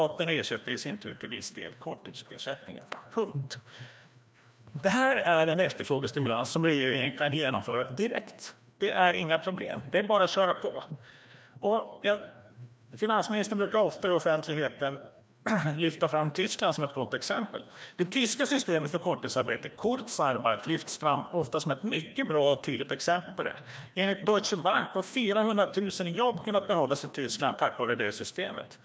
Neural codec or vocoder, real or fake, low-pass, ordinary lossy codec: codec, 16 kHz, 1 kbps, FreqCodec, larger model; fake; none; none